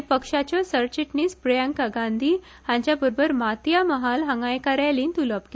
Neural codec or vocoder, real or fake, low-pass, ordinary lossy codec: none; real; none; none